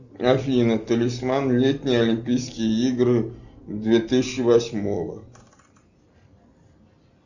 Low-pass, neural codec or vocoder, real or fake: 7.2 kHz; none; real